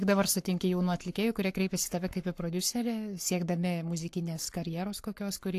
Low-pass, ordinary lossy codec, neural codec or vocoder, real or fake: 14.4 kHz; AAC, 64 kbps; codec, 44.1 kHz, 7.8 kbps, Pupu-Codec; fake